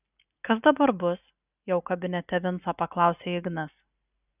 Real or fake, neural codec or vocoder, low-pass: real; none; 3.6 kHz